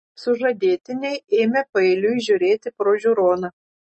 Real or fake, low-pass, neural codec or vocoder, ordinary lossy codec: real; 9.9 kHz; none; MP3, 32 kbps